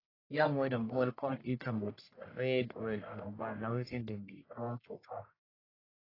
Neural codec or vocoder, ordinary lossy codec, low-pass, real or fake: codec, 44.1 kHz, 1.7 kbps, Pupu-Codec; AAC, 24 kbps; 5.4 kHz; fake